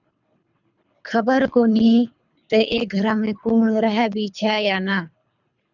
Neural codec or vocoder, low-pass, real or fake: codec, 24 kHz, 3 kbps, HILCodec; 7.2 kHz; fake